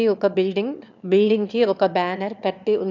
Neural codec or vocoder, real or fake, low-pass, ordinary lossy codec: autoencoder, 22.05 kHz, a latent of 192 numbers a frame, VITS, trained on one speaker; fake; 7.2 kHz; none